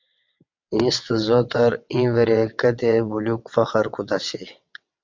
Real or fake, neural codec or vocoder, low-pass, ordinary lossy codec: fake; vocoder, 22.05 kHz, 80 mel bands, WaveNeXt; 7.2 kHz; MP3, 64 kbps